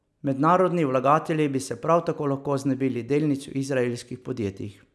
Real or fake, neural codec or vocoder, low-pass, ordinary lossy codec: real; none; none; none